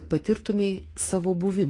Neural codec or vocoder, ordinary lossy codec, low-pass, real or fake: autoencoder, 48 kHz, 32 numbers a frame, DAC-VAE, trained on Japanese speech; AAC, 32 kbps; 10.8 kHz; fake